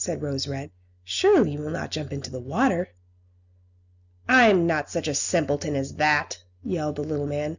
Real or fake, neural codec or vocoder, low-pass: real; none; 7.2 kHz